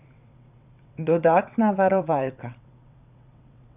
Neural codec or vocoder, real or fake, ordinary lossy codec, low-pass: vocoder, 22.05 kHz, 80 mel bands, WaveNeXt; fake; none; 3.6 kHz